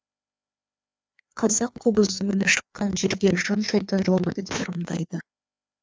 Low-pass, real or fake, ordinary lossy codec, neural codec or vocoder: none; fake; none; codec, 16 kHz, 2 kbps, FreqCodec, larger model